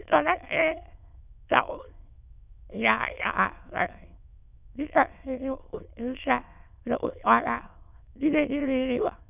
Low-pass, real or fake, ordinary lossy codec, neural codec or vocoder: 3.6 kHz; fake; none; autoencoder, 22.05 kHz, a latent of 192 numbers a frame, VITS, trained on many speakers